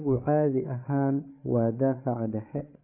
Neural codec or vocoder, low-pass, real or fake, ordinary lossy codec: codec, 16 kHz, 8 kbps, FreqCodec, larger model; 3.6 kHz; fake; AAC, 32 kbps